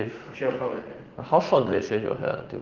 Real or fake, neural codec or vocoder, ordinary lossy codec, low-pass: fake; vocoder, 44.1 kHz, 80 mel bands, Vocos; Opus, 16 kbps; 7.2 kHz